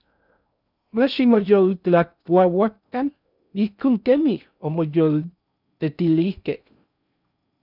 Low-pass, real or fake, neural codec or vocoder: 5.4 kHz; fake; codec, 16 kHz in and 24 kHz out, 0.6 kbps, FocalCodec, streaming, 2048 codes